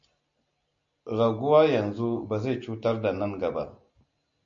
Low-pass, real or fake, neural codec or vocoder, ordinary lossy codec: 7.2 kHz; real; none; MP3, 48 kbps